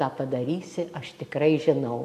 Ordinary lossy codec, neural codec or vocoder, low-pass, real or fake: AAC, 64 kbps; none; 14.4 kHz; real